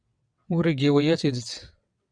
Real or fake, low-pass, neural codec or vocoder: fake; 9.9 kHz; vocoder, 22.05 kHz, 80 mel bands, WaveNeXt